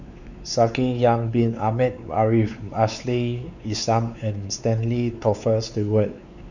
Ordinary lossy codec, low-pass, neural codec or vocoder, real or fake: none; 7.2 kHz; codec, 16 kHz, 4 kbps, X-Codec, WavLM features, trained on Multilingual LibriSpeech; fake